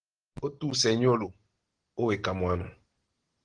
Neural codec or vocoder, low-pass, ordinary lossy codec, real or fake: none; 9.9 kHz; Opus, 16 kbps; real